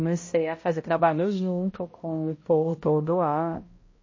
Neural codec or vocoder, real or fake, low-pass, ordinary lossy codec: codec, 16 kHz, 0.5 kbps, X-Codec, HuBERT features, trained on balanced general audio; fake; 7.2 kHz; MP3, 32 kbps